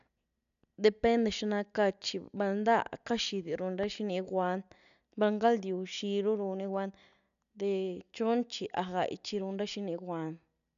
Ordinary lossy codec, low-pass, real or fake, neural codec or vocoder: none; 7.2 kHz; real; none